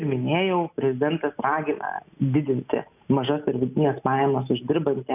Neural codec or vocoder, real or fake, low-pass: none; real; 3.6 kHz